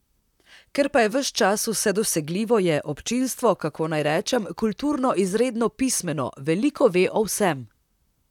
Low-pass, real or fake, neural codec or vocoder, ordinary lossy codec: 19.8 kHz; fake; vocoder, 44.1 kHz, 128 mel bands, Pupu-Vocoder; none